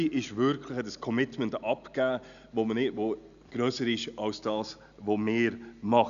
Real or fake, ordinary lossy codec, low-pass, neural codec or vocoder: real; none; 7.2 kHz; none